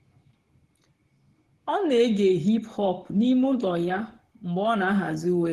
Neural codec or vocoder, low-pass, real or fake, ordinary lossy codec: codec, 44.1 kHz, 7.8 kbps, Pupu-Codec; 14.4 kHz; fake; Opus, 16 kbps